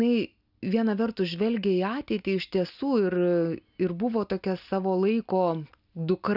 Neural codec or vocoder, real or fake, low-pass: none; real; 5.4 kHz